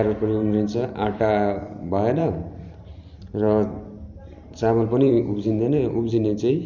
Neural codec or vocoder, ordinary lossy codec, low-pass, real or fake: vocoder, 44.1 kHz, 128 mel bands every 512 samples, BigVGAN v2; none; 7.2 kHz; fake